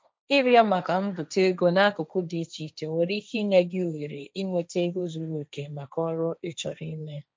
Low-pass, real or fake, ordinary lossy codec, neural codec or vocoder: none; fake; none; codec, 16 kHz, 1.1 kbps, Voila-Tokenizer